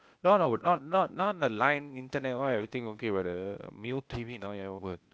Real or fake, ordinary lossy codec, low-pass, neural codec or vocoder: fake; none; none; codec, 16 kHz, 0.8 kbps, ZipCodec